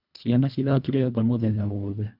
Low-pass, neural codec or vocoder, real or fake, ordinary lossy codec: 5.4 kHz; codec, 24 kHz, 1.5 kbps, HILCodec; fake; none